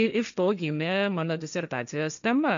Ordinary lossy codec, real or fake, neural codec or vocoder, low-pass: MP3, 96 kbps; fake; codec, 16 kHz, 1.1 kbps, Voila-Tokenizer; 7.2 kHz